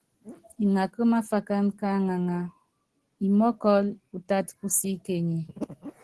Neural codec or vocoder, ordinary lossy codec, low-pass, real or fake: none; Opus, 16 kbps; 10.8 kHz; real